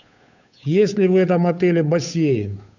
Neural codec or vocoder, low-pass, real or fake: codec, 16 kHz, 8 kbps, FunCodec, trained on Chinese and English, 25 frames a second; 7.2 kHz; fake